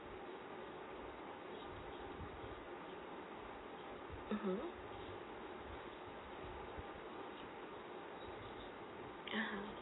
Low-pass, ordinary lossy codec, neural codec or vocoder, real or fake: 7.2 kHz; AAC, 16 kbps; none; real